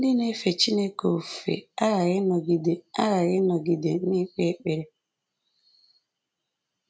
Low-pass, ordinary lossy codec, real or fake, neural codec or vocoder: none; none; real; none